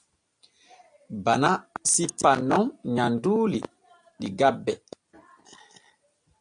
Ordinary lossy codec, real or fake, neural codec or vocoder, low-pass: AAC, 64 kbps; real; none; 9.9 kHz